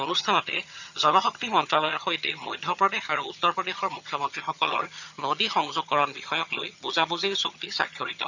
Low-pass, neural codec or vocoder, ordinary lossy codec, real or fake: 7.2 kHz; vocoder, 22.05 kHz, 80 mel bands, HiFi-GAN; none; fake